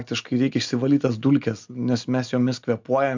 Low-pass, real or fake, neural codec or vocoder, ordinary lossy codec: 7.2 kHz; real; none; MP3, 64 kbps